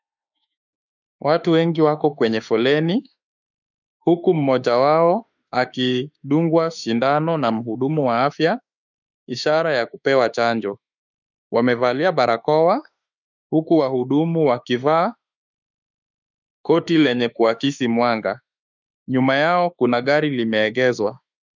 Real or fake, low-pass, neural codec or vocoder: fake; 7.2 kHz; autoencoder, 48 kHz, 32 numbers a frame, DAC-VAE, trained on Japanese speech